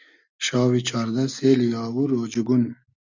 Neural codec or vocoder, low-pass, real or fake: none; 7.2 kHz; real